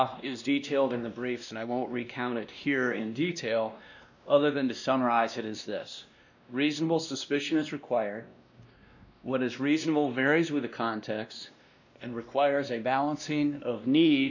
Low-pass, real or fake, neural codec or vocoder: 7.2 kHz; fake; codec, 16 kHz, 1 kbps, X-Codec, WavLM features, trained on Multilingual LibriSpeech